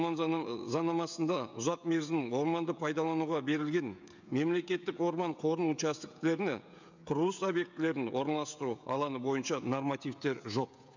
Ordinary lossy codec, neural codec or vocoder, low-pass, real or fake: none; codec, 16 kHz, 16 kbps, FreqCodec, smaller model; 7.2 kHz; fake